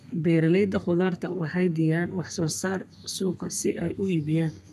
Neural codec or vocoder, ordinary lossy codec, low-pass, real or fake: codec, 32 kHz, 1.9 kbps, SNAC; none; 14.4 kHz; fake